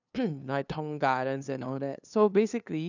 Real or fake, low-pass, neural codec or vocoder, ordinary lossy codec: fake; 7.2 kHz; codec, 16 kHz, 2 kbps, FunCodec, trained on LibriTTS, 25 frames a second; none